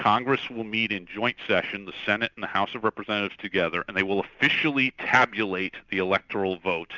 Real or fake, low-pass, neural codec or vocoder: real; 7.2 kHz; none